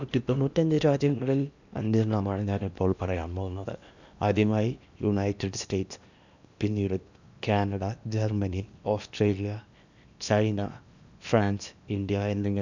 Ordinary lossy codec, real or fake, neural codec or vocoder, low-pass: none; fake; codec, 16 kHz in and 24 kHz out, 0.8 kbps, FocalCodec, streaming, 65536 codes; 7.2 kHz